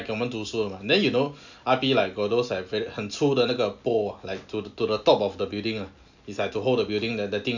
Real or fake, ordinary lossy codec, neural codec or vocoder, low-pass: real; none; none; 7.2 kHz